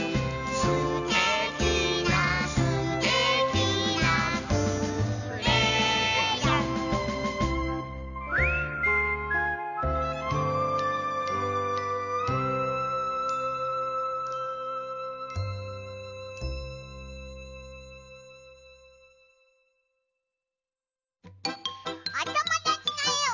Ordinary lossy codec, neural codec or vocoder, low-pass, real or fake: none; none; 7.2 kHz; real